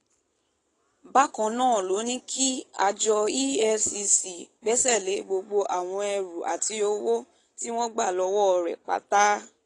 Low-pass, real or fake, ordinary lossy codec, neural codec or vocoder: 10.8 kHz; real; AAC, 32 kbps; none